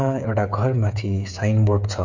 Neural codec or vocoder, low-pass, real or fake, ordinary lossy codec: codec, 16 kHz, 8 kbps, FreqCodec, smaller model; 7.2 kHz; fake; none